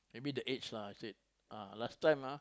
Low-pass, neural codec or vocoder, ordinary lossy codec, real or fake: none; none; none; real